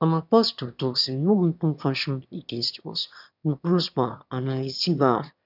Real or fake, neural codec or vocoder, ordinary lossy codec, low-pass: fake; autoencoder, 22.05 kHz, a latent of 192 numbers a frame, VITS, trained on one speaker; none; 5.4 kHz